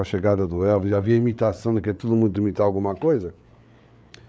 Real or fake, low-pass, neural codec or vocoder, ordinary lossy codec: fake; none; codec, 16 kHz, 8 kbps, FreqCodec, larger model; none